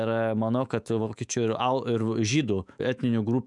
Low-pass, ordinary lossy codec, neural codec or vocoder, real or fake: 10.8 kHz; MP3, 96 kbps; autoencoder, 48 kHz, 128 numbers a frame, DAC-VAE, trained on Japanese speech; fake